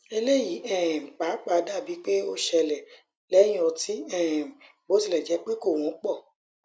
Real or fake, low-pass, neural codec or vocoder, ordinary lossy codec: real; none; none; none